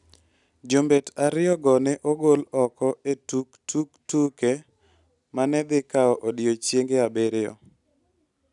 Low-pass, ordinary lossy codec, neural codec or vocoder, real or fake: 10.8 kHz; none; none; real